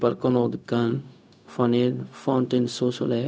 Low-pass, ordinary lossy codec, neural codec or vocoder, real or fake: none; none; codec, 16 kHz, 0.4 kbps, LongCat-Audio-Codec; fake